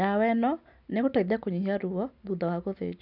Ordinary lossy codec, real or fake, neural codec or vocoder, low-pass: none; real; none; 5.4 kHz